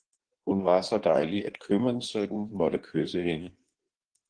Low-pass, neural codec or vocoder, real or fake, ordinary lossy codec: 9.9 kHz; codec, 16 kHz in and 24 kHz out, 1.1 kbps, FireRedTTS-2 codec; fake; Opus, 16 kbps